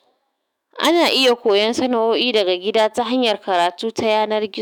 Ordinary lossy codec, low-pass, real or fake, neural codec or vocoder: none; none; fake; autoencoder, 48 kHz, 128 numbers a frame, DAC-VAE, trained on Japanese speech